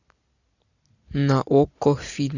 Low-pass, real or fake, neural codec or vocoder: 7.2 kHz; real; none